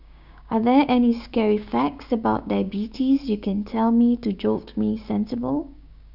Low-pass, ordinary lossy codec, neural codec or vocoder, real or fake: 5.4 kHz; none; codec, 16 kHz, 6 kbps, DAC; fake